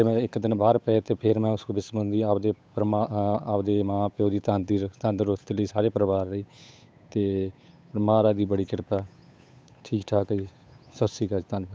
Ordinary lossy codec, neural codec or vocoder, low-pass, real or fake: none; codec, 16 kHz, 8 kbps, FunCodec, trained on Chinese and English, 25 frames a second; none; fake